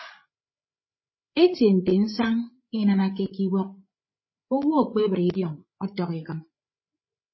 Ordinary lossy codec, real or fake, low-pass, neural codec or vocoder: MP3, 24 kbps; fake; 7.2 kHz; codec, 16 kHz, 8 kbps, FreqCodec, larger model